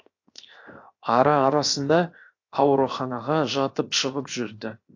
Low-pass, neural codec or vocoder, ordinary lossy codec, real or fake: 7.2 kHz; codec, 16 kHz, 0.7 kbps, FocalCodec; AAC, 48 kbps; fake